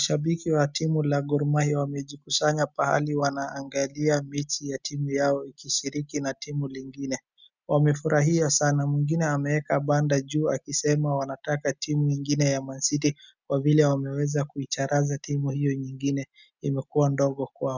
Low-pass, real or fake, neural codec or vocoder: 7.2 kHz; real; none